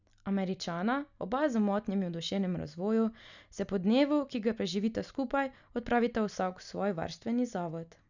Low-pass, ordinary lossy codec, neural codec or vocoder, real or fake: 7.2 kHz; none; none; real